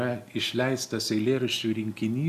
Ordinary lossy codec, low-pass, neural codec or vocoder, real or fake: AAC, 96 kbps; 14.4 kHz; none; real